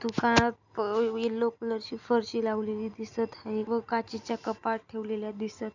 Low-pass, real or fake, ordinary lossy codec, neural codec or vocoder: 7.2 kHz; real; none; none